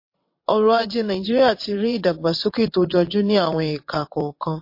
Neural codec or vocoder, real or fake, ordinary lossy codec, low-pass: vocoder, 22.05 kHz, 80 mel bands, WaveNeXt; fake; MP3, 32 kbps; 5.4 kHz